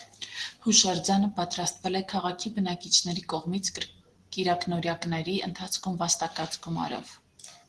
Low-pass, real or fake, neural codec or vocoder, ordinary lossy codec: 10.8 kHz; real; none; Opus, 16 kbps